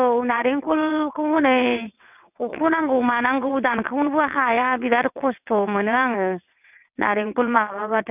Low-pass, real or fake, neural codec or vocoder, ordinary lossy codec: 3.6 kHz; fake; vocoder, 22.05 kHz, 80 mel bands, WaveNeXt; none